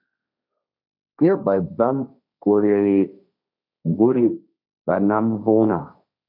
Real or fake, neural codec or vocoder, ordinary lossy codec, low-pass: fake; codec, 16 kHz, 1.1 kbps, Voila-Tokenizer; MP3, 48 kbps; 5.4 kHz